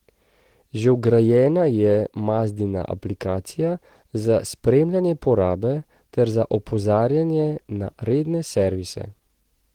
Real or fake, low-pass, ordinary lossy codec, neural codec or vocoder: real; 19.8 kHz; Opus, 16 kbps; none